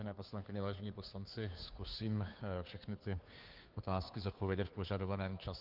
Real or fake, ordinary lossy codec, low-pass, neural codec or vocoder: fake; Opus, 24 kbps; 5.4 kHz; codec, 16 kHz, 2 kbps, FunCodec, trained on Chinese and English, 25 frames a second